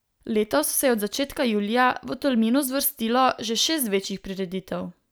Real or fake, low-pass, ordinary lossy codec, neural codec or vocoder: real; none; none; none